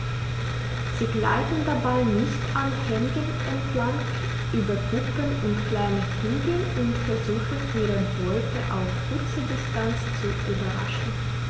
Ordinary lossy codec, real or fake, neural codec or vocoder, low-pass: none; real; none; none